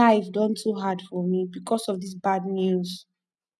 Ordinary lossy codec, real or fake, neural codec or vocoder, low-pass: none; real; none; none